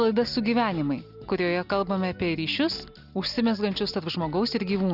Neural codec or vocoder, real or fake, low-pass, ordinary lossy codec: none; real; 5.4 kHz; Opus, 64 kbps